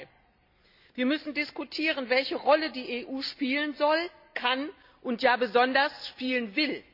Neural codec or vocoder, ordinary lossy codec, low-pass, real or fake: none; none; 5.4 kHz; real